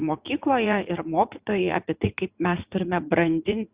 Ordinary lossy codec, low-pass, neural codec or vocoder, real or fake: Opus, 64 kbps; 3.6 kHz; vocoder, 44.1 kHz, 80 mel bands, Vocos; fake